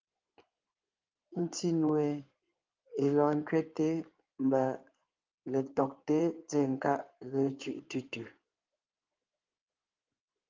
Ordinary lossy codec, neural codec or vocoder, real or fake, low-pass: Opus, 32 kbps; codec, 16 kHz in and 24 kHz out, 2.2 kbps, FireRedTTS-2 codec; fake; 7.2 kHz